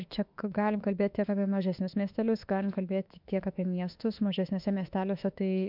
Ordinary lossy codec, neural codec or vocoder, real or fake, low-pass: MP3, 48 kbps; autoencoder, 48 kHz, 32 numbers a frame, DAC-VAE, trained on Japanese speech; fake; 5.4 kHz